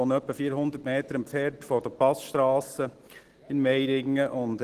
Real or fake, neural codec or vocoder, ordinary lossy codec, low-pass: real; none; Opus, 16 kbps; 14.4 kHz